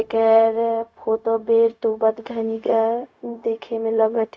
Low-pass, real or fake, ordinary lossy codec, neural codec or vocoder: none; fake; none; codec, 16 kHz, 0.4 kbps, LongCat-Audio-Codec